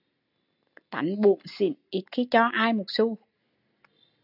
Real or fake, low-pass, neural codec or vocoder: real; 5.4 kHz; none